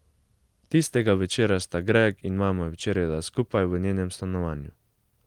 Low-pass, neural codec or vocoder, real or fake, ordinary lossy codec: 19.8 kHz; none; real; Opus, 24 kbps